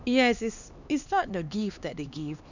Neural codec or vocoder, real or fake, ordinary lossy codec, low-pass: codec, 16 kHz, 2 kbps, X-Codec, HuBERT features, trained on LibriSpeech; fake; none; 7.2 kHz